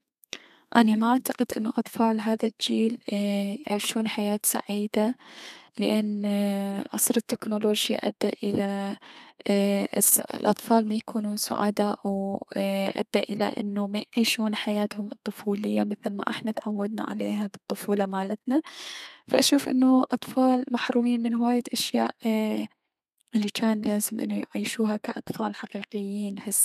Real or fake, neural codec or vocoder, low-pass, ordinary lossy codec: fake; codec, 32 kHz, 1.9 kbps, SNAC; 14.4 kHz; none